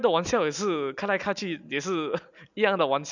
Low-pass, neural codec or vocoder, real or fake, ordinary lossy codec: 7.2 kHz; none; real; none